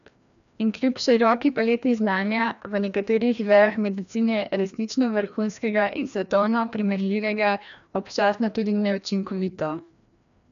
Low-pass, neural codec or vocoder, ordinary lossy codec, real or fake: 7.2 kHz; codec, 16 kHz, 1 kbps, FreqCodec, larger model; none; fake